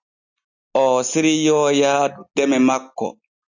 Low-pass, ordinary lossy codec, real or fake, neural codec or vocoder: 7.2 kHz; AAC, 48 kbps; real; none